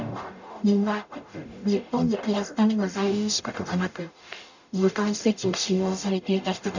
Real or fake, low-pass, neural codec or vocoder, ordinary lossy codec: fake; 7.2 kHz; codec, 44.1 kHz, 0.9 kbps, DAC; none